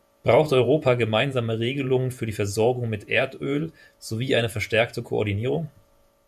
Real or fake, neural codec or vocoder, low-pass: fake; vocoder, 48 kHz, 128 mel bands, Vocos; 14.4 kHz